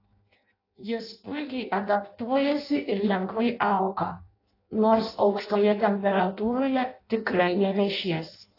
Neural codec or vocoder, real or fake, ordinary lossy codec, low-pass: codec, 16 kHz in and 24 kHz out, 0.6 kbps, FireRedTTS-2 codec; fake; AAC, 32 kbps; 5.4 kHz